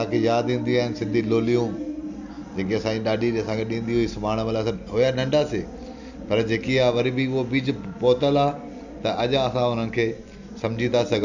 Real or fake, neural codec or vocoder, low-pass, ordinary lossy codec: real; none; 7.2 kHz; none